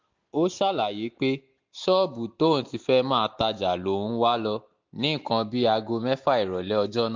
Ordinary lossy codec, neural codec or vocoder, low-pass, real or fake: MP3, 48 kbps; none; 7.2 kHz; real